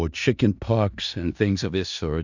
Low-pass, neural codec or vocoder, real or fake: 7.2 kHz; codec, 16 kHz in and 24 kHz out, 0.4 kbps, LongCat-Audio-Codec, four codebook decoder; fake